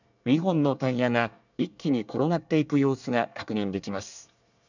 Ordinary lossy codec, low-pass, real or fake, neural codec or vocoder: none; 7.2 kHz; fake; codec, 24 kHz, 1 kbps, SNAC